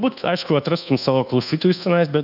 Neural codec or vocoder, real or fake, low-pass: codec, 24 kHz, 1.2 kbps, DualCodec; fake; 5.4 kHz